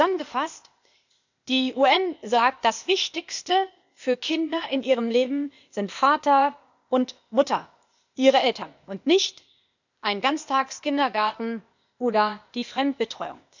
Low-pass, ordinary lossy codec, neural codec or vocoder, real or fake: 7.2 kHz; none; codec, 16 kHz, 0.8 kbps, ZipCodec; fake